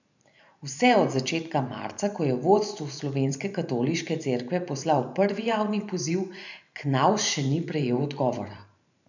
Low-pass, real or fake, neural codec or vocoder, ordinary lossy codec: 7.2 kHz; fake; vocoder, 44.1 kHz, 128 mel bands every 512 samples, BigVGAN v2; none